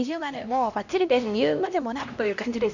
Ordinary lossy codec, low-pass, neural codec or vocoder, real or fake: none; 7.2 kHz; codec, 16 kHz, 1 kbps, X-Codec, HuBERT features, trained on LibriSpeech; fake